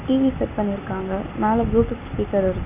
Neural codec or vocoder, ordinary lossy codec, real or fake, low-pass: none; none; real; 3.6 kHz